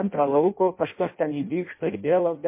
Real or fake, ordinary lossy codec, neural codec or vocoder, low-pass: fake; MP3, 24 kbps; codec, 16 kHz in and 24 kHz out, 0.6 kbps, FireRedTTS-2 codec; 3.6 kHz